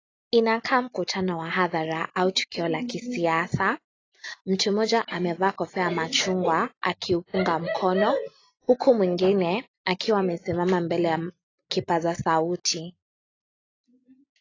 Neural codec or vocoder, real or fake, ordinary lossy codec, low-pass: none; real; AAC, 32 kbps; 7.2 kHz